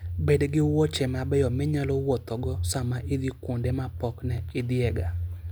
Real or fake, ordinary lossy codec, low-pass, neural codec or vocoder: real; none; none; none